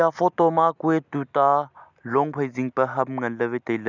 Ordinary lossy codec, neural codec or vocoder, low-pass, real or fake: none; none; 7.2 kHz; real